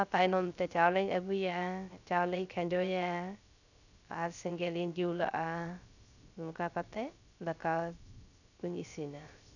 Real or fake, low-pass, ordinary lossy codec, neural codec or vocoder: fake; 7.2 kHz; none; codec, 16 kHz, 0.3 kbps, FocalCodec